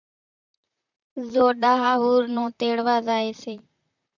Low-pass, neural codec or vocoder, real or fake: 7.2 kHz; vocoder, 44.1 kHz, 128 mel bands, Pupu-Vocoder; fake